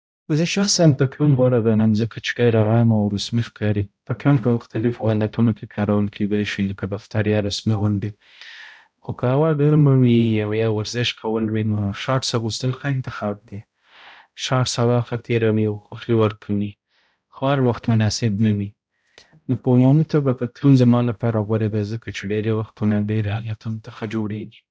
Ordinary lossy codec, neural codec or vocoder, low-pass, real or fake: none; codec, 16 kHz, 0.5 kbps, X-Codec, HuBERT features, trained on balanced general audio; none; fake